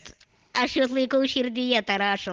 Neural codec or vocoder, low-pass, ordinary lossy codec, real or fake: none; 7.2 kHz; Opus, 16 kbps; real